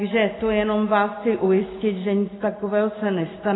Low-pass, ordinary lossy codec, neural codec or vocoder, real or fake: 7.2 kHz; AAC, 16 kbps; none; real